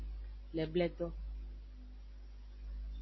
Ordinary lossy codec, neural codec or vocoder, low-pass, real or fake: MP3, 24 kbps; none; 7.2 kHz; real